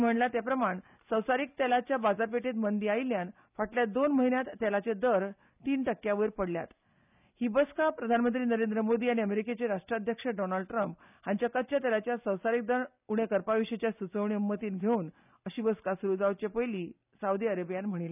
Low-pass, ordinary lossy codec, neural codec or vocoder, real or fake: 3.6 kHz; none; none; real